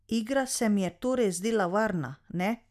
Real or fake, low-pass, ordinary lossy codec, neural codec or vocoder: real; 14.4 kHz; none; none